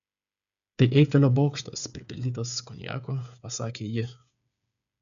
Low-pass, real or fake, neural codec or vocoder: 7.2 kHz; fake; codec, 16 kHz, 8 kbps, FreqCodec, smaller model